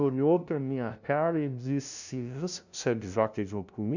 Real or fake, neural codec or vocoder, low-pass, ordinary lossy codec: fake; codec, 16 kHz, 0.5 kbps, FunCodec, trained on LibriTTS, 25 frames a second; 7.2 kHz; none